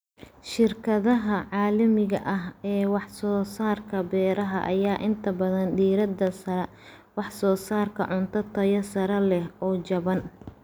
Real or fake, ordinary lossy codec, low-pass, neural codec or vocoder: real; none; none; none